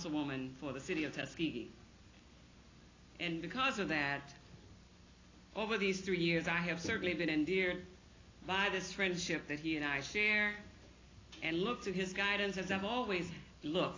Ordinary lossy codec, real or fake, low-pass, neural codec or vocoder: AAC, 32 kbps; real; 7.2 kHz; none